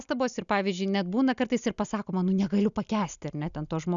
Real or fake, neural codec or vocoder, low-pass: real; none; 7.2 kHz